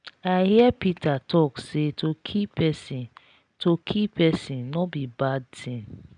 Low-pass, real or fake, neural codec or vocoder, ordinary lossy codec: 9.9 kHz; real; none; none